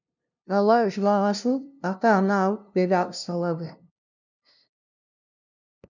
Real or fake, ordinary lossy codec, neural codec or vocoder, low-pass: fake; none; codec, 16 kHz, 0.5 kbps, FunCodec, trained on LibriTTS, 25 frames a second; 7.2 kHz